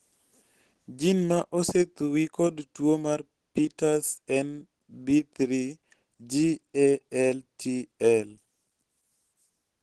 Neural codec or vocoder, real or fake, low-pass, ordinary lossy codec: none; real; 10.8 kHz; Opus, 16 kbps